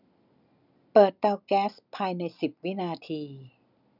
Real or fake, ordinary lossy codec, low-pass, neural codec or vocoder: real; none; 5.4 kHz; none